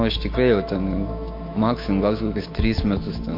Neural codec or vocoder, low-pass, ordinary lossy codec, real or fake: autoencoder, 48 kHz, 128 numbers a frame, DAC-VAE, trained on Japanese speech; 5.4 kHz; MP3, 32 kbps; fake